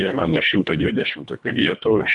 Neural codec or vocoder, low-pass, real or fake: codec, 24 kHz, 1.5 kbps, HILCodec; 10.8 kHz; fake